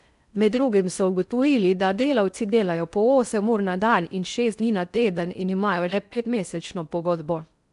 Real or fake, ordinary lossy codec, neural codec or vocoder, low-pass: fake; none; codec, 16 kHz in and 24 kHz out, 0.6 kbps, FocalCodec, streaming, 4096 codes; 10.8 kHz